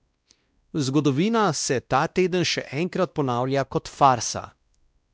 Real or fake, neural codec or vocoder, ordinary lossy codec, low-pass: fake; codec, 16 kHz, 1 kbps, X-Codec, WavLM features, trained on Multilingual LibriSpeech; none; none